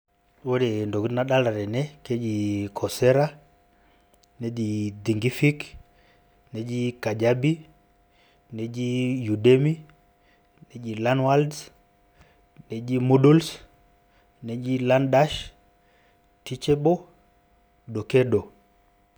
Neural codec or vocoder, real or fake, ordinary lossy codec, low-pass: none; real; none; none